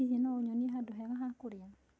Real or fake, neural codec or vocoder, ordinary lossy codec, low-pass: real; none; none; none